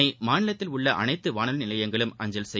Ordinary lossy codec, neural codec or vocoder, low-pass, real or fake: none; none; none; real